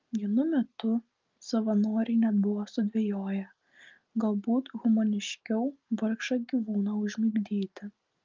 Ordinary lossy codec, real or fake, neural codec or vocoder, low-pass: Opus, 24 kbps; real; none; 7.2 kHz